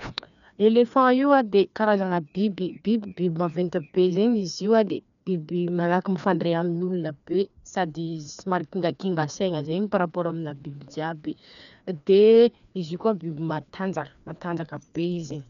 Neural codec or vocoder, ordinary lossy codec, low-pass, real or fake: codec, 16 kHz, 2 kbps, FreqCodec, larger model; none; 7.2 kHz; fake